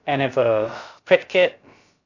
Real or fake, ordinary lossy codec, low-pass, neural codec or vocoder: fake; none; 7.2 kHz; codec, 16 kHz, about 1 kbps, DyCAST, with the encoder's durations